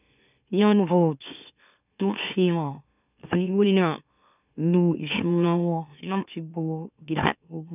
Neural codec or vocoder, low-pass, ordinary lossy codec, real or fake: autoencoder, 44.1 kHz, a latent of 192 numbers a frame, MeloTTS; 3.6 kHz; none; fake